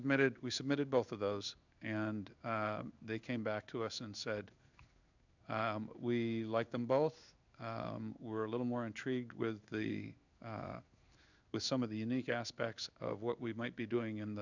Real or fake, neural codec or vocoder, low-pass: fake; codec, 16 kHz in and 24 kHz out, 1 kbps, XY-Tokenizer; 7.2 kHz